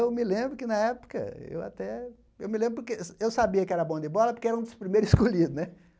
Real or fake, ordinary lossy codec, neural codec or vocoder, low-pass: real; none; none; none